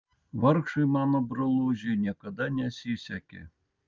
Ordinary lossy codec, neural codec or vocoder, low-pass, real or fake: Opus, 24 kbps; none; 7.2 kHz; real